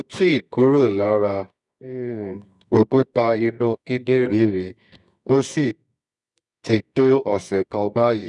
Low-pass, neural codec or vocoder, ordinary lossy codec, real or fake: 10.8 kHz; codec, 24 kHz, 0.9 kbps, WavTokenizer, medium music audio release; none; fake